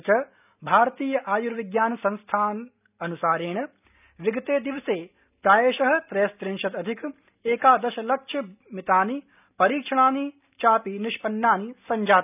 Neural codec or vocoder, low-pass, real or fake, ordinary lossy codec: none; 3.6 kHz; real; none